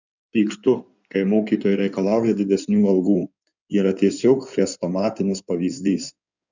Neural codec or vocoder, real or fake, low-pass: codec, 16 kHz in and 24 kHz out, 2.2 kbps, FireRedTTS-2 codec; fake; 7.2 kHz